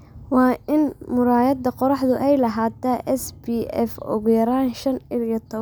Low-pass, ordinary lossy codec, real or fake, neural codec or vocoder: none; none; real; none